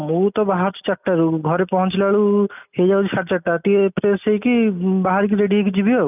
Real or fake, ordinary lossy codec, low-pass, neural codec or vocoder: real; none; 3.6 kHz; none